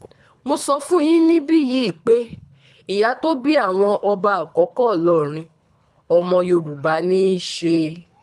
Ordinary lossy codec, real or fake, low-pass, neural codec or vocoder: none; fake; none; codec, 24 kHz, 3 kbps, HILCodec